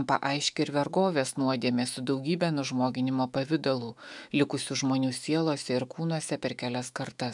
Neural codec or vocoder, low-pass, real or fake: autoencoder, 48 kHz, 128 numbers a frame, DAC-VAE, trained on Japanese speech; 10.8 kHz; fake